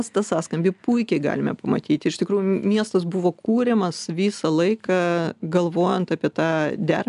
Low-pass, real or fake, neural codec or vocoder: 10.8 kHz; real; none